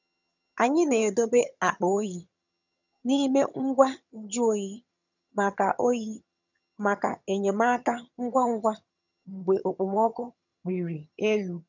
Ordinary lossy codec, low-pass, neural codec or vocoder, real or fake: none; 7.2 kHz; vocoder, 22.05 kHz, 80 mel bands, HiFi-GAN; fake